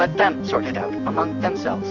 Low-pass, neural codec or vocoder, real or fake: 7.2 kHz; none; real